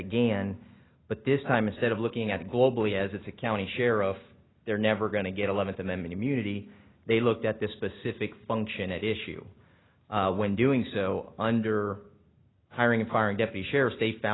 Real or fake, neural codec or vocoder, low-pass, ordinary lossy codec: real; none; 7.2 kHz; AAC, 16 kbps